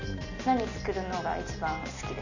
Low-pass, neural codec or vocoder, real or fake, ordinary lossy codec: 7.2 kHz; none; real; none